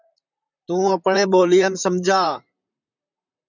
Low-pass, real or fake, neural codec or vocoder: 7.2 kHz; fake; vocoder, 44.1 kHz, 128 mel bands, Pupu-Vocoder